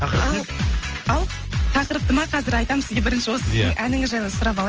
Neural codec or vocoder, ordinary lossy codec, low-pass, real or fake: none; Opus, 24 kbps; 7.2 kHz; real